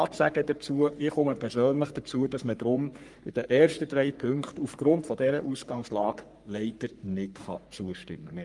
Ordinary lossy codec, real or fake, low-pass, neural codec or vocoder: Opus, 32 kbps; fake; 10.8 kHz; codec, 44.1 kHz, 3.4 kbps, Pupu-Codec